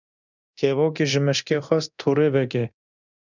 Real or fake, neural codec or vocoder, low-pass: fake; codec, 24 kHz, 0.9 kbps, DualCodec; 7.2 kHz